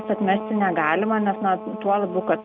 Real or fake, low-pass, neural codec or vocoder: real; 7.2 kHz; none